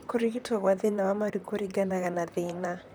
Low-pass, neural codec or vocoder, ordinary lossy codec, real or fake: none; vocoder, 44.1 kHz, 128 mel bands, Pupu-Vocoder; none; fake